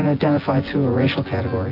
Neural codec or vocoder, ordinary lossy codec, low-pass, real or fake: vocoder, 24 kHz, 100 mel bands, Vocos; AAC, 32 kbps; 5.4 kHz; fake